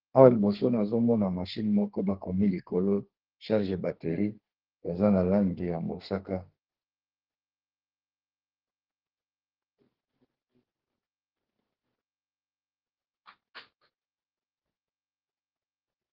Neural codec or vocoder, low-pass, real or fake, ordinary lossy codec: codec, 32 kHz, 1.9 kbps, SNAC; 5.4 kHz; fake; Opus, 16 kbps